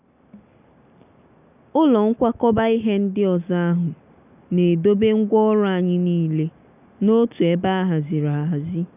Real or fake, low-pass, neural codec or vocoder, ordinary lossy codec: real; 3.6 kHz; none; none